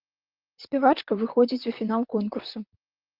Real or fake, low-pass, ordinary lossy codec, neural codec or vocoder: fake; 5.4 kHz; Opus, 32 kbps; vocoder, 44.1 kHz, 128 mel bands, Pupu-Vocoder